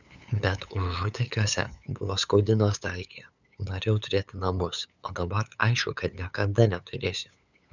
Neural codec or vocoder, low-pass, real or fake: codec, 16 kHz, 8 kbps, FunCodec, trained on LibriTTS, 25 frames a second; 7.2 kHz; fake